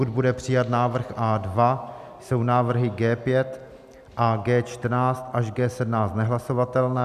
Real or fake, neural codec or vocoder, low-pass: real; none; 14.4 kHz